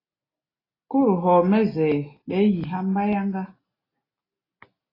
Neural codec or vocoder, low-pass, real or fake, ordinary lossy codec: none; 5.4 kHz; real; AAC, 24 kbps